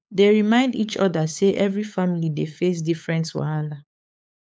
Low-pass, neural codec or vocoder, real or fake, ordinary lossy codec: none; codec, 16 kHz, 8 kbps, FunCodec, trained on LibriTTS, 25 frames a second; fake; none